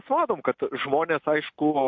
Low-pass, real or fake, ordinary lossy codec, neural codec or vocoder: 7.2 kHz; real; MP3, 48 kbps; none